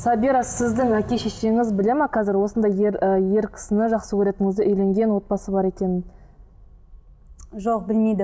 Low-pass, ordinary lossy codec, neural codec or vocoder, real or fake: none; none; none; real